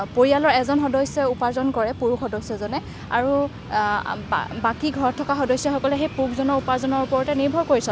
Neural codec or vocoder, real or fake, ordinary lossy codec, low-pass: none; real; none; none